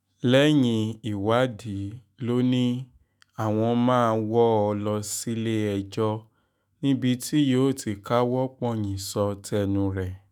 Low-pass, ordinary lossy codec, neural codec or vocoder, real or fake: none; none; autoencoder, 48 kHz, 128 numbers a frame, DAC-VAE, trained on Japanese speech; fake